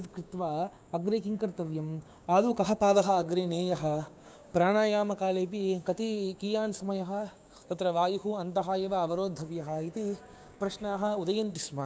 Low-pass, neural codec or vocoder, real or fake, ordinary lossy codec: none; codec, 16 kHz, 6 kbps, DAC; fake; none